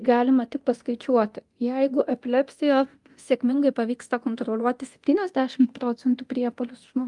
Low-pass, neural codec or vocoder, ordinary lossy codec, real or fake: 10.8 kHz; codec, 24 kHz, 0.9 kbps, DualCodec; Opus, 32 kbps; fake